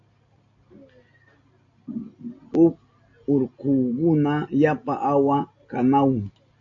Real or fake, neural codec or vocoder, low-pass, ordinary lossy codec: real; none; 7.2 kHz; AAC, 48 kbps